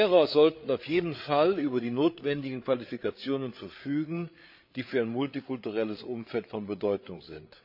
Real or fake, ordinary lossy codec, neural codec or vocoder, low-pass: fake; none; codec, 16 kHz, 8 kbps, FreqCodec, larger model; 5.4 kHz